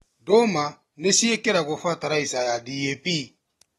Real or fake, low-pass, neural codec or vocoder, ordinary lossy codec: real; 19.8 kHz; none; AAC, 32 kbps